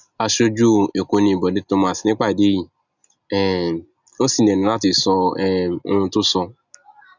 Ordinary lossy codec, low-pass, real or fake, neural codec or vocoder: none; 7.2 kHz; real; none